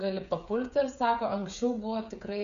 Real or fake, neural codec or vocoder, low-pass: fake; codec, 16 kHz, 8 kbps, FreqCodec, smaller model; 7.2 kHz